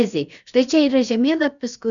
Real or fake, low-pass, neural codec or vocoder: fake; 7.2 kHz; codec, 16 kHz, about 1 kbps, DyCAST, with the encoder's durations